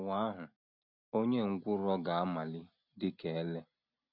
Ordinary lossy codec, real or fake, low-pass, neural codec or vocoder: none; real; 5.4 kHz; none